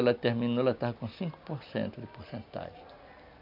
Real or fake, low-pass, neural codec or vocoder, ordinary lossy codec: real; 5.4 kHz; none; none